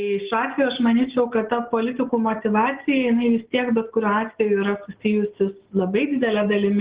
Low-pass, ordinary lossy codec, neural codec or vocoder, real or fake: 3.6 kHz; Opus, 16 kbps; none; real